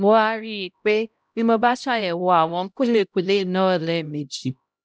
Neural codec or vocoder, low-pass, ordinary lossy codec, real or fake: codec, 16 kHz, 0.5 kbps, X-Codec, HuBERT features, trained on LibriSpeech; none; none; fake